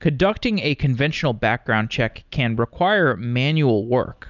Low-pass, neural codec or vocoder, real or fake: 7.2 kHz; none; real